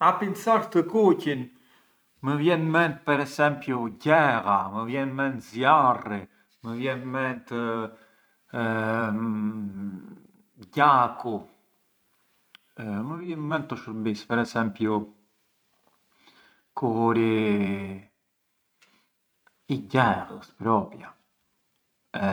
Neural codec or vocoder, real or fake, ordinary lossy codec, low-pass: vocoder, 44.1 kHz, 128 mel bands every 512 samples, BigVGAN v2; fake; none; none